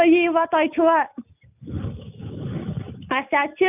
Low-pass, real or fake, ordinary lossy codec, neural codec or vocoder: 3.6 kHz; real; none; none